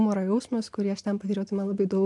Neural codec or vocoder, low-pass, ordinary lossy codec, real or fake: none; 10.8 kHz; MP3, 64 kbps; real